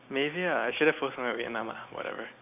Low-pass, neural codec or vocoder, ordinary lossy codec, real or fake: 3.6 kHz; none; none; real